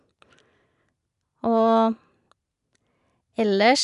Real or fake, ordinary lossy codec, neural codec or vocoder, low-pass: real; MP3, 96 kbps; none; 10.8 kHz